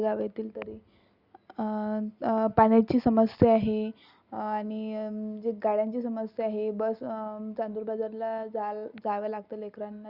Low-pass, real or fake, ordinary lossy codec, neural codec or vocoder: 5.4 kHz; real; Opus, 64 kbps; none